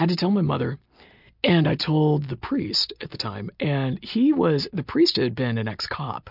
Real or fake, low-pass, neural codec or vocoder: real; 5.4 kHz; none